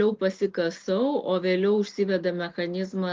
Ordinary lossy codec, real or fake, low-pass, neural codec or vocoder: Opus, 32 kbps; real; 7.2 kHz; none